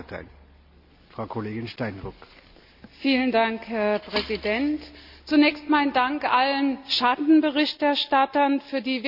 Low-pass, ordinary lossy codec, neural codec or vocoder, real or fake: 5.4 kHz; none; none; real